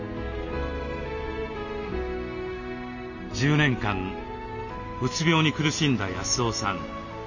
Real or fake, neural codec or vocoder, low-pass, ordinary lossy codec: real; none; 7.2 kHz; none